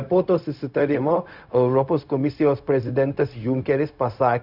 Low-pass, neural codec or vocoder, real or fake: 5.4 kHz; codec, 16 kHz, 0.4 kbps, LongCat-Audio-Codec; fake